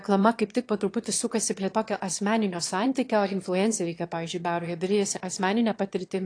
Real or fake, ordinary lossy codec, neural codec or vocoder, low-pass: fake; AAC, 48 kbps; autoencoder, 22.05 kHz, a latent of 192 numbers a frame, VITS, trained on one speaker; 9.9 kHz